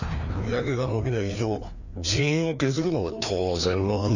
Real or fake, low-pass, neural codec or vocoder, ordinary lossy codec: fake; 7.2 kHz; codec, 16 kHz, 2 kbps, FreqCodec, larger model; none